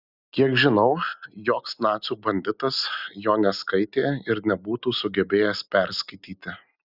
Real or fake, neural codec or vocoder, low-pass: real; none; 5.4 kHz